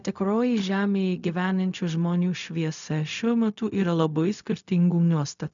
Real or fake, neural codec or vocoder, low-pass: fake; codec, 16 kHz, 0.4 kbps, LongCat-Audio-Codec; 7.2 kHz